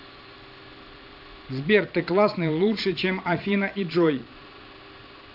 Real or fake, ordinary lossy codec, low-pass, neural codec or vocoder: fake; none; 5.4 kHz; vocoder, 22.05 kHz, 80 mel bands, Vocos